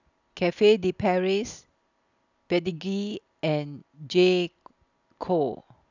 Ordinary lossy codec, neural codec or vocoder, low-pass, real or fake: none; none; 7.2 kHz; real